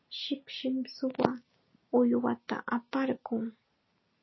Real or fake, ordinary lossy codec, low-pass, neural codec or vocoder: real; MP3, 24 kbps; 7.2 kHz; none